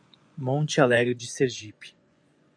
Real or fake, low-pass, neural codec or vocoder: fake; 9.9 kHz; vocoder, 24 kHz, 100 mel bands, Vocos